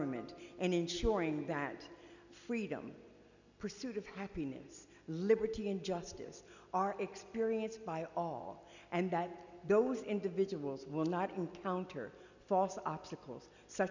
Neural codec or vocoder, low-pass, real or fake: none; 7.2 kHz; real